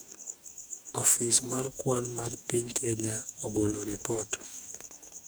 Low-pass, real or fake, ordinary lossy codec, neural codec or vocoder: none; fake; none; codec, 44.1 kHz, 2.6 kbps, DAC